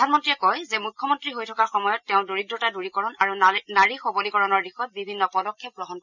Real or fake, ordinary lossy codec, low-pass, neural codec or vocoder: real; none; 7.2 kHz; none